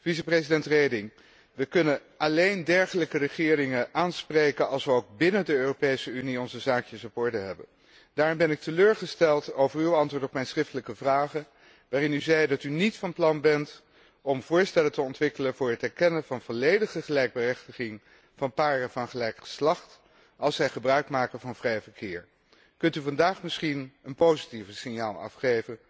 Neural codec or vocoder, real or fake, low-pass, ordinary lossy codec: none; real; none; none